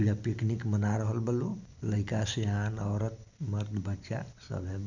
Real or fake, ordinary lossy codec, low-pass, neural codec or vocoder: real; none; 7.2 kHz; none